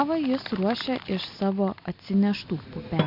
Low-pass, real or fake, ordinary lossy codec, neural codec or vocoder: 5.4 kHz; real; MP3, 48 kbps; none